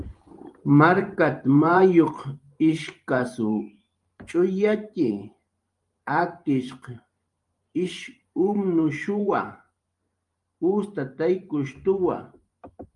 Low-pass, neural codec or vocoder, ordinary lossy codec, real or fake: 10.8 kHz; vocoder, 44.1 kHz, 128 mel bands every 512 samples, BigVGAN v2; Opus, 32 kbps; fake